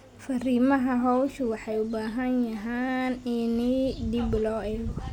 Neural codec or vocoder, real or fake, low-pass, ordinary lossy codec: none; real; 19.8 kHz; none